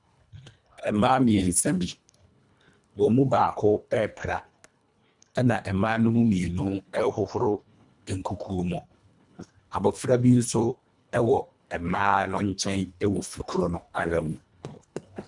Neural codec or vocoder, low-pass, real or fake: codec, 24 kHz, 1.5 kbps, HILCodec; 10.8 kHz; fake